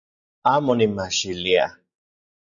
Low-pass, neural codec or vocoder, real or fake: 7.2 kHz; none; real